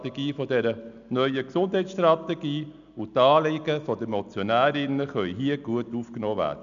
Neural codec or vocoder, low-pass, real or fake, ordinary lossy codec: none; 7.2 kHz; real; none